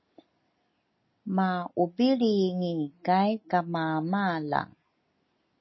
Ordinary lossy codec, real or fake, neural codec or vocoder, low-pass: MP3, 24 kbps; real; none; 7.2 kHz